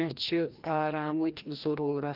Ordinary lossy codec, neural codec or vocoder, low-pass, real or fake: Opus, 32 kbps; codec, 16 kHz, 1 kbps, FreqCodec, larger model; 5.4 kHz; fake